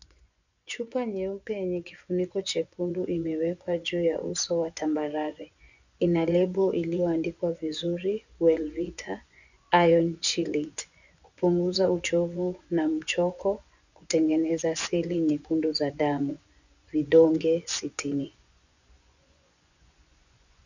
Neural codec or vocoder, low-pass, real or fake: vocoder, 24 kHz, 100 mel bands, Vocos; 7.2 kHz; fake